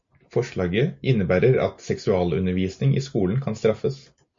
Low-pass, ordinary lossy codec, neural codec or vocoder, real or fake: 7.2 kHz; MP3, 48 kbps; none; real